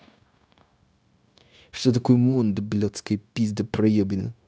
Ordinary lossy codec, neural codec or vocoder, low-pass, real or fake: none; codec, 16 kHz, 0.9 kbps, LongCat-Audio-Codec; none; fake